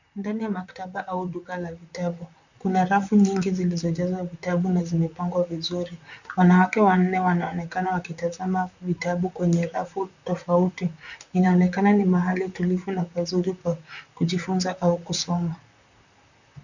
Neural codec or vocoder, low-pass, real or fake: vocoder, 44.1 kHz, 128 mel bands, Pupu-Vocoder; 7.2 kHz; fake